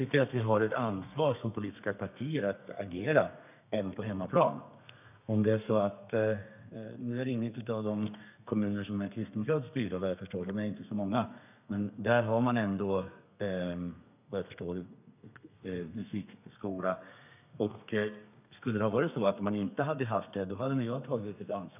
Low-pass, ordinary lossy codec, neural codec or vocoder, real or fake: 3.6 kHz; none; codec, 32 kHz, 1.9 kbps, SNAC; fake